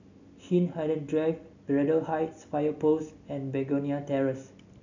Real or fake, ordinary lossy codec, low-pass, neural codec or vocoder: real; none; 7.2 kHz; none